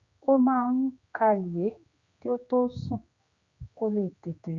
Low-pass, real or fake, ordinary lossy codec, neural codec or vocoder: 7.2 kHz; fake; Opus, 64 kbps; codec, 16 kHz, 2 kbps, X-Codec, HuBERT features, trained on general audio